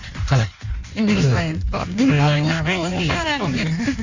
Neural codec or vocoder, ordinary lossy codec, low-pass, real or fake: codec, 16 kHz in and 24 kHz out, 1.1 kbps, FireRedTTS-2 codec; Opus, 64 kbps; 7.2 kHz; fake